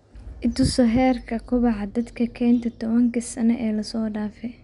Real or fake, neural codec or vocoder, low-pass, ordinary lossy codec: real; none; 10.8 kHz; none